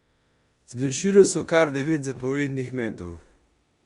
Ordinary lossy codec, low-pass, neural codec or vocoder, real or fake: Opus, 64 kbps; 10.8 kHz; codec, 16 kHz in and 24 kHz out, 0.9 kbps, LongCat-Audio-Codec, four codebook decoder; fake